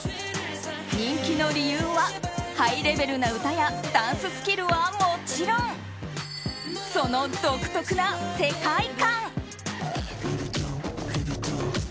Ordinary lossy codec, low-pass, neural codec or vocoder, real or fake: none; none; none; real